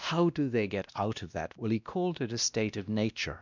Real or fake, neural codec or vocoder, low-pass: fake; codec, 16 kHz, 1 kbps, X-Codec, WavLM features, trained on Multilingual LibriSpeech; 7.2 kHz